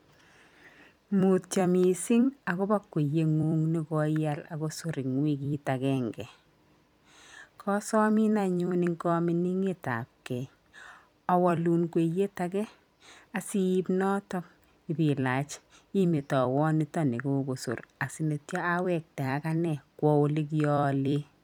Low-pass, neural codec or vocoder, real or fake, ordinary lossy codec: 19.8 kHz; vocoder, 44.1 kHz, 128 mel bands every 256 samples, BigVGAN v2; fake; none